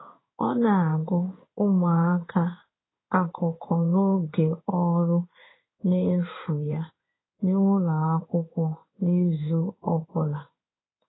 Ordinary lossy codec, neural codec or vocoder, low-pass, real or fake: AAC, 16 kbps; codec, 16 kHz in and 24 kHz out, 1 kbps, XY-Tokenizer; 7.2 kHz; fake